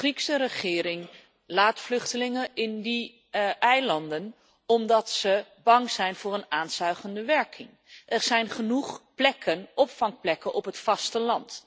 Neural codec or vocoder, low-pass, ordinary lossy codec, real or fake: none; none; none; real